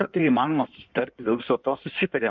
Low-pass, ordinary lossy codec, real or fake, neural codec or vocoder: 7.2 kHz; MP3, 64 kbps; fake; codec, 16 kHz in and 24 kHz out, 1.1 kbps, FireRedTTS-2 codec